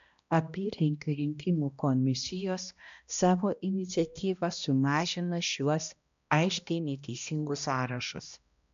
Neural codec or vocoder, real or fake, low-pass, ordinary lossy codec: codec, 16 kHz, 1 kbps, X-Codec, HuBERT features, trained on balanced general audio; fake; 7.2 kHz; MP3, 64 kbps